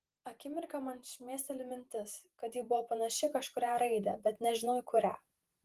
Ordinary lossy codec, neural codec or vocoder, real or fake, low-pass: Opus, 24 kbps; vocoder, 48 kHz, 128 mel bands, Vocos; fake; 14.4 kHz